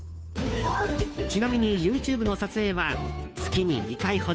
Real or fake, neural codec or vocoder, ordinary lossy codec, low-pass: fake; codec, 16 kHz, 2 kbps, FunCodec, trained on Chinese and English, 25 frames a second; none; none